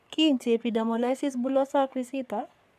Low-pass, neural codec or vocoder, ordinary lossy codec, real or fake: 14.4 kHz; codec, 44.1 kHz, 3.4 kbps, Pupu-Codec; none; fake